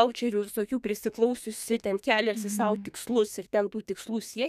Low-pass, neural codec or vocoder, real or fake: 14.4 kHz; codec, 32 kHz, 1.9 kbps, SNAC; fake